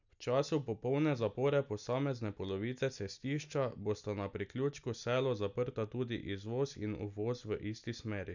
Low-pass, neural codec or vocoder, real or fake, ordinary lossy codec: 7.2 kHz; none; real; none